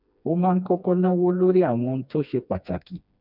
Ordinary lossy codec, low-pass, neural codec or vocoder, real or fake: none; 5.4 kHz; codec, 16 kHz, 2 kbps, FreqCodec, smaller model; fake